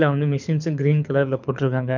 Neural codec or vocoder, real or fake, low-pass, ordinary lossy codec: codec, 24 kHz, 6 kbps, HILCodec; fake; 7.2 kHz; none